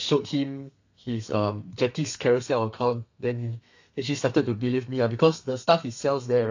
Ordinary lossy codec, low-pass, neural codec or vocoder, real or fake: AAC, 48 kbps; 7.2 kHz; codec, 32 kHz, 1.9 kbps, SNAC; fake